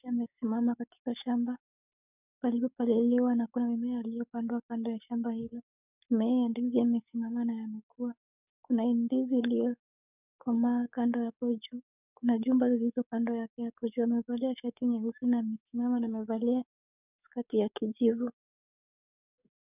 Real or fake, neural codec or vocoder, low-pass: fake; codec, 44.1 kHz, 7.8 kbps, DAC; 3.6 kHz